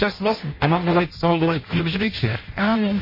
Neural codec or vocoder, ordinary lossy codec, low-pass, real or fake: codec, 16 kHz in and 24 kHz out, 0.6 kbps, FireRedTTS-2 codec; MP3, 24 kbps; 5.4 kHz; fake